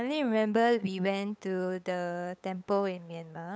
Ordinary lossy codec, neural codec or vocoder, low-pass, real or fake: none; codec, 16 kHz, 8 kbps, FunCodec, trained on LibriTTS, 25 frames a second; none; fake